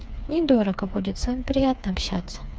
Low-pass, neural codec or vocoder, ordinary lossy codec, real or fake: none; codec, 16 kHz, 4 kbps, FreqCodec, smaller model; none; fake